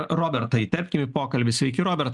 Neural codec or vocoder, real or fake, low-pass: none; real; 10.8 kHz